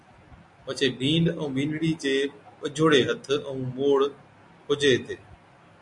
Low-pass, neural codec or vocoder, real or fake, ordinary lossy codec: 10.8 kHz; none; real; MP3, 48 kbps